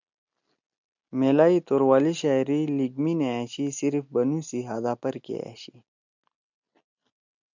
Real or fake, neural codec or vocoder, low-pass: real; none; 7.2 kHz